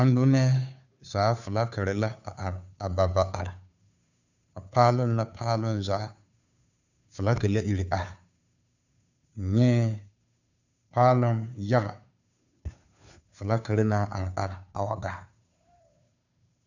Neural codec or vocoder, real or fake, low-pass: codec, 16 kHz, 2 kbps, FunCodec, trained on Chinese and English, 25 frames a second; fake; 7.2 kHz